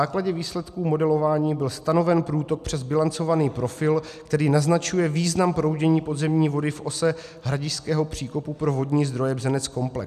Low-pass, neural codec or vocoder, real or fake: 14.4 kHz; none; real